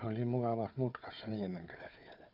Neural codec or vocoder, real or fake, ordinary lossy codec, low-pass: codec, 16 kHz, 4 kbps, FunCodec, trained on Chinese and English, 50 frames a second; fake; none; 5.4 kHz